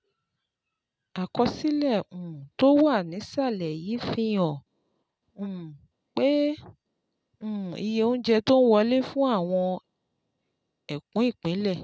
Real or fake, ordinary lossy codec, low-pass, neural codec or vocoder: real; none; none; none